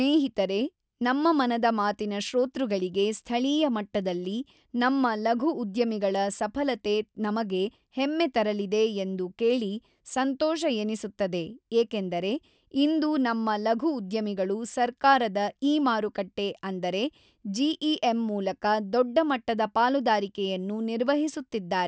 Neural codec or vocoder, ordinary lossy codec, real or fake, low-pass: none; none; real; none